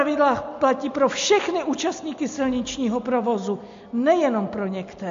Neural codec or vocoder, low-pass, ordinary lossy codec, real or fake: none; 7.2 kHz; MP3, 48 kbps; real